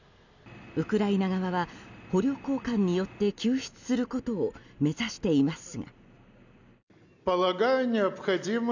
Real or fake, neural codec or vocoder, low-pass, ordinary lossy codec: real; none; 7.2 kHz; none